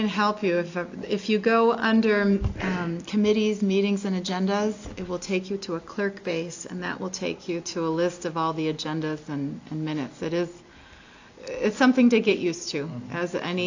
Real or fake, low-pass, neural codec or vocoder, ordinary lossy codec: real; 7.2 kHz; none; AAC, 48 kbps